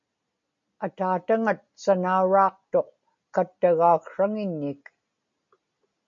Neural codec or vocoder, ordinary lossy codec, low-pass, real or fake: none; MP3, 48 kbps; 7.2 kHz; real